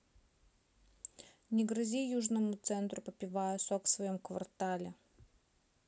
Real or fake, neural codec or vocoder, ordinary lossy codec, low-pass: real; none; none; none